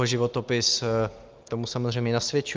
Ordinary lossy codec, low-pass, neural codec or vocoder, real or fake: Opus, 24 kbps; 7.2 kHz; none; real